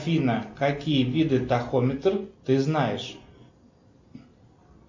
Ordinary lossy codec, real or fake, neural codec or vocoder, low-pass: MP3, 64 kbps; real; none; 7.2 kHz